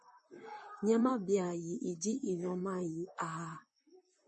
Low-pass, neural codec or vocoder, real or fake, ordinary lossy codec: 10.8 kHz; vocoder, 44.1 kHz, 128 mel bands, Pupu-Vocoder; fake; MP3, 32 kbps